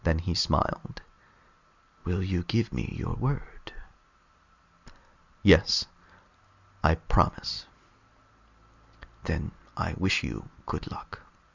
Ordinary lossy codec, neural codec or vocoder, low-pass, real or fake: Opus, 64 kbps; none; 7.2 kHz; real